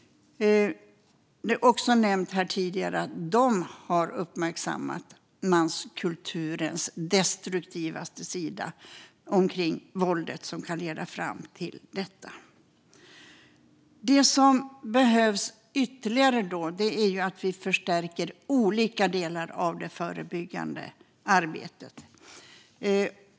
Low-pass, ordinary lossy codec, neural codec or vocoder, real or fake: none; none; none; real